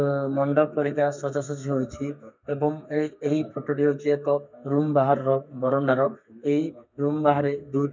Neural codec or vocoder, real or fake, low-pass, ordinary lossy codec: codec, 32 kHz, 1.9 kbps, SNAC; fake; 7.2 kHz; MP3, 64 kbps